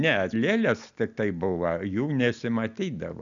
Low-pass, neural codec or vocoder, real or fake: 7.2 kHz; none; real